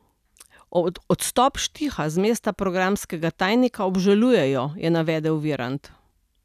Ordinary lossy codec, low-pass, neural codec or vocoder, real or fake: none; 14.4 kHz; none; real